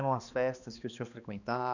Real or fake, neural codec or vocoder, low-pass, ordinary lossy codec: fake; codec, 16 kHz, 2 kbps, X-Codec, HuBERT features, trained on balanced general audio; 7.2 kHz; none